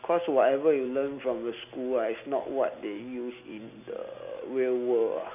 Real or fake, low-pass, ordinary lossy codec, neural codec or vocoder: real; 3.6 kHz; none; none